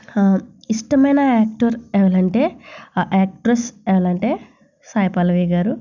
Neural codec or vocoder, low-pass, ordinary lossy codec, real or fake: none; 7.2 kHz; none; real